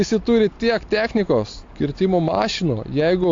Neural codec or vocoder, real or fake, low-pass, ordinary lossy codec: none; real; 7.2 kHz; AAC, 48 kbps